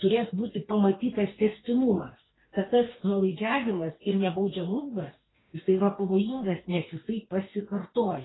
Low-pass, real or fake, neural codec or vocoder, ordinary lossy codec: 7.2 kHz; fake; codec, 44.1 kHz, 2.6 kbps, DAC; AAC, 16 kbps